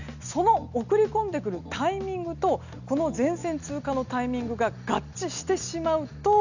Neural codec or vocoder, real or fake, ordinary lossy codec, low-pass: none; real; none; 7.2 kHz